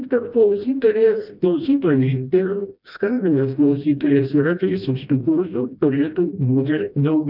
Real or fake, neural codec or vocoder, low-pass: fake; codec, 16 kHz, 1 kbps, FreqCodec, smaller model; 5.4 kHz